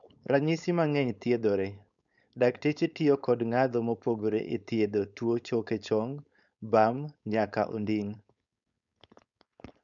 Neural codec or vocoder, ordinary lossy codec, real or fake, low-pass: codec, 16 kHz, 4.8 kbps, FACodec; none; fake; 7.2 kHz